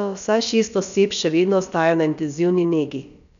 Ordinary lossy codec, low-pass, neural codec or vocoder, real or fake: none; 7.2 kHz; codec, 16 kHz, about 1 kbps, DyCAST, with the encoder's durations; fake